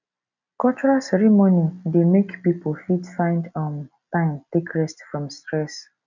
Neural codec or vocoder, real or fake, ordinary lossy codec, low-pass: none; real; none; 7.2 kHz